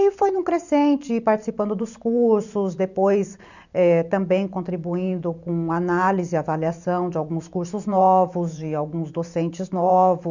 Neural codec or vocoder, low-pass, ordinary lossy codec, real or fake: vocoder, 44.1 kHz, 128 mel bands every 512 samples, BigVGAN v2; 7.2 kHz; none; fake